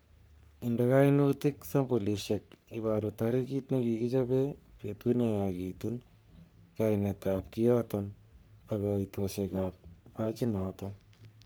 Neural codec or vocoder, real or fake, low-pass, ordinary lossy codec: codec, 44.1 kHz, 3.4 kbps, Pupu-Codec; fake; none; none